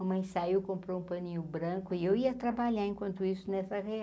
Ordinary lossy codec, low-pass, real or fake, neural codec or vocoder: none; none; real; none